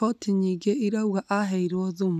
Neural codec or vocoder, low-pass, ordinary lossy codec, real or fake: none; 14.4 kHz; none; real